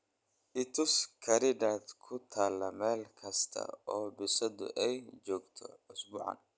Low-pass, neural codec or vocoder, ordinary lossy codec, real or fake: none; none; none; real